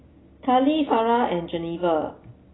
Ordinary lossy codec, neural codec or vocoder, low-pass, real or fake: AAC, 16 kbps; none; 7.2 kHz; real